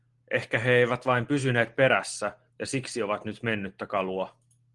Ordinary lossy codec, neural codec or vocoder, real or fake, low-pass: Opus, 24 kbps; none; real; 10.8 kHz